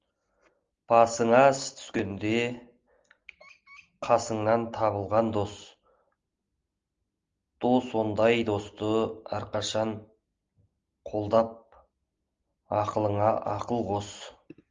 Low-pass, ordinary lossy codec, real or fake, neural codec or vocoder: 7.2 kHz; Opus, 32 kbps; real; none